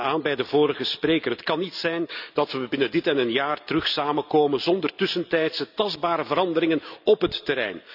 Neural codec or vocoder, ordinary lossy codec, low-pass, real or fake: none; none; 5.4 kHz; real